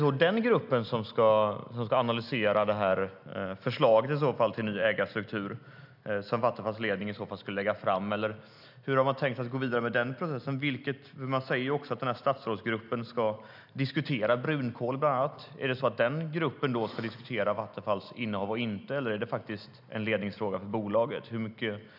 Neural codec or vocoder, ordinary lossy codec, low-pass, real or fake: none; none; 5.4 kHz; real